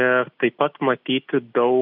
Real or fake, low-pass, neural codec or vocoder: real; 5.4 kHz; none